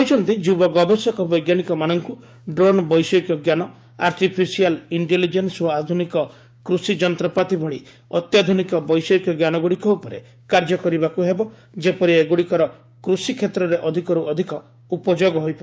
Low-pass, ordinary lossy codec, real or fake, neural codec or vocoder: none; none; fake; codec, 16 kHz, 6 kbps, DAC